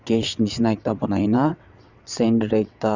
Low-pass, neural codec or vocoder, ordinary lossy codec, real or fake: 7.2 kHz; vocoder, 22.05 kHz, 80 mel bands, WaveNeXt; Opus, 64 kbps; fake